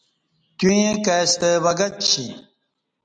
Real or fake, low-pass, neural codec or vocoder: real; 9.9 kHz; none